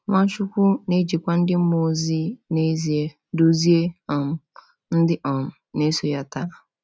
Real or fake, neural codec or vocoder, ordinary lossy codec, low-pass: real; none; none; none